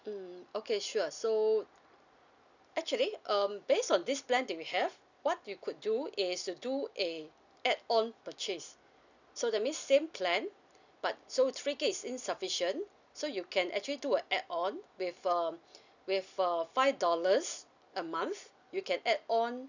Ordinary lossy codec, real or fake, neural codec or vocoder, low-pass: none; real; none; 7.2 kHz